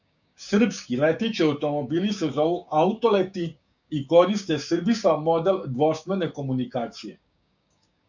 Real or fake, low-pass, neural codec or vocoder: fake; 7.2 kHz; codec, 16 kHz, 6 kbps, DAC